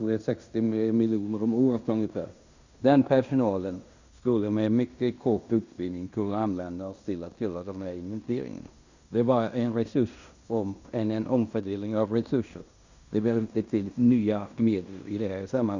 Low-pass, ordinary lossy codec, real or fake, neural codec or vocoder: 7.2 kHz; Opus, 64 kbps; fake; codec, 16 kHz in and 24 kHz out, 0.9 kbps, LongCat-Audio-Codec, fine tuned four codebook decoder